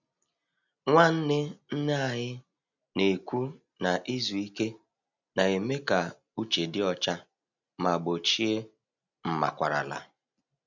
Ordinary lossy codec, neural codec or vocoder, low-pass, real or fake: none; none; 7.2 kHz; real